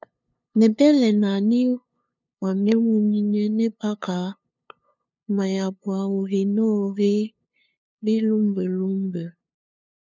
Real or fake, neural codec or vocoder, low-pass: fake; codec, 16 kHz, 2 kbps, FunCodec, trained on LibriTTS, 25 frames a second; 7.2 kHz